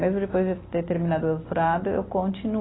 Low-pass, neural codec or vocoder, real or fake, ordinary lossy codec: 7.2 kHz; none; real; AAC, 16 kbps